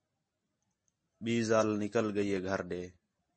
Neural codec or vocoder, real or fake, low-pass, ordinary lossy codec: none; real; 9.9 kHz; MP3, 32 kbps